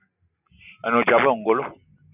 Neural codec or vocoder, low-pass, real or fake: none; 3.6 kHz; real